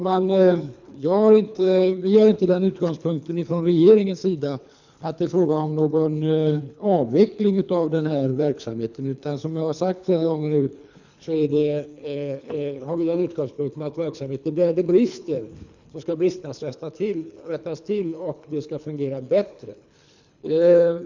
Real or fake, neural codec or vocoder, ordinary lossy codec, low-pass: fake; codec, 24 kHz, 3 kbps, HILCodec; none; 7.2 kHz